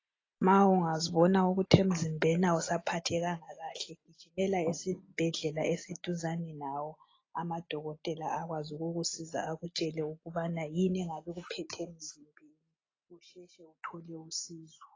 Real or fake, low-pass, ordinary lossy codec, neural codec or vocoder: real; 7.2 kHz; AAC, 32 kbps; none